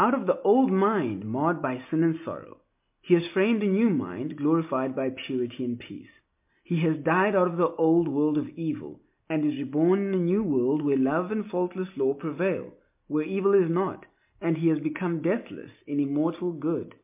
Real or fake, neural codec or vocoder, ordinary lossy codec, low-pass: real; none; MP3, 32 kbps; 3.6 kHz